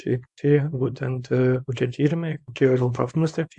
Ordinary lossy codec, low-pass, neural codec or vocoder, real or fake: MP3, 64 kbps; 10.8 kHz; codec, 24 kHz, 0.9 kbps, WavTokenizer, small release; fake